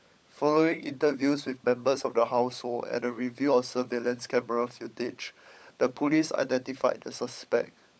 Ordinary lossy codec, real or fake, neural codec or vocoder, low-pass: none; fake; codec, 16 kHz, 16 kbps, FunCodec, trained on LibriTTS, 50 frames a second; none